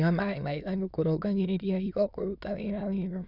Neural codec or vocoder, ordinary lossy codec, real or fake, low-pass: autoencoder, 22.05 kHz, a latent of 192 numbers a frame, VITS, trained on many speakers; none; fake; 5.4 kHz